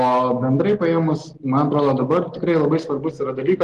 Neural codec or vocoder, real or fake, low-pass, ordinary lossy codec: none; real; 10.8 kHz; Opus, 16 kbps